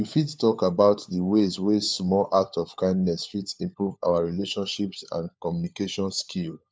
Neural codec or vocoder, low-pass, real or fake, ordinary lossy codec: codec, 16 kHz, 4 kbps, FunCodec, trained on LibriTTS, 50 frames a second; none; fake; none